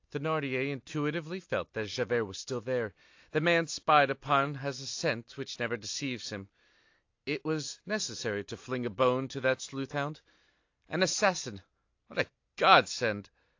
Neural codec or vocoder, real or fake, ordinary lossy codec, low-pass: none; real; AAC, 48 kbps; 7.2 kHz